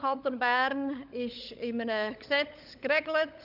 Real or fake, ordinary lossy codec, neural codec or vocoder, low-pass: fake; none; codec, 16 kHz, 16 kbps, FunCodec, trained on LibriTTS, 50 frames a second; 5.4 kHz